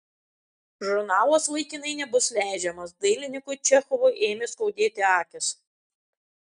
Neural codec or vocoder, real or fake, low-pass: none; real; 9.9 kHz